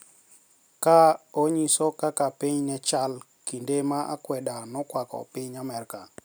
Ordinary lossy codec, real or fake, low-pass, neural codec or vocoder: none; real; none; none